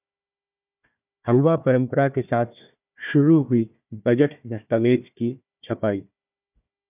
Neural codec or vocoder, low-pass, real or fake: codec, 16 kHz, 1 kbps, FunCodec, trained on Chinese and English, 50 frames a second; 3.6 kHz; fake